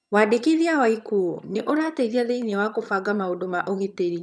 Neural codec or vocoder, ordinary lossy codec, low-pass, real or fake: vocoder, 22.05 kHz, 80 mel bands, HiFi-GAN; none; none; fake